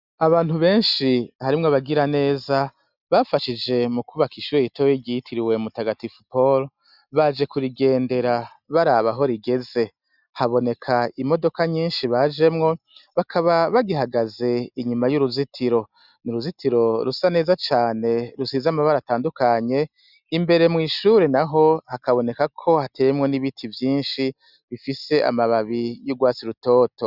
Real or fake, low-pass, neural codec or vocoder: real; 5.4 kHz; none